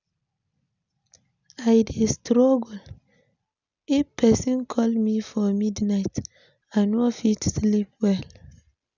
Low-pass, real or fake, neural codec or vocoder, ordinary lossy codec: 7.2 kHz; real; none; none